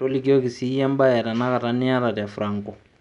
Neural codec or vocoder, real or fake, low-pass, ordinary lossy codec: none; real; 10.8 kHz; none